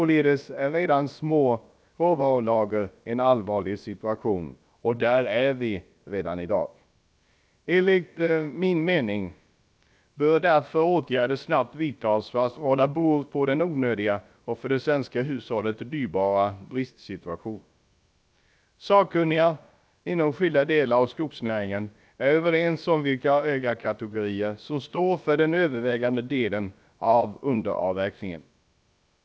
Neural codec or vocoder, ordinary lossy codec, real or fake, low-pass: codec, 16 kHz, about 1 kbps, DyCAST, with the encoder's durations; none; fake; none